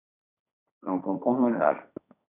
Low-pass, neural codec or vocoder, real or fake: 3.6 kHz; codec, 16 kHz, 1.1 kbps, Voila-Tokenizer; fake